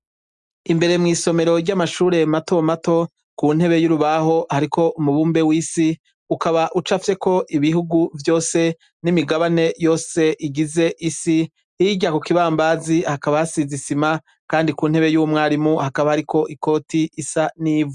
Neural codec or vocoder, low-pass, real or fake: none; 10.8 kHz; real